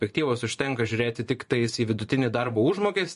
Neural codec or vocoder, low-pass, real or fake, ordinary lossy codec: vocoder, 24 kHz, 100 mel bands, Vocos; 10.8 kHz; fake; MP3, 48 kbps